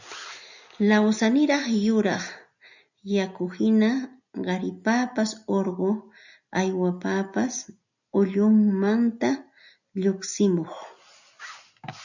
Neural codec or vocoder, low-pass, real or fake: none; 7.2 kHz; real